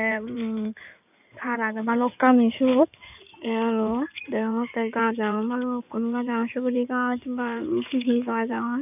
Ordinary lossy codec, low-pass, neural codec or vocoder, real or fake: none; 3.6 kHz; codec, 16 kHz in and 24 kHz out, 2.2 kbps, FireRedTTS-2 codec; fake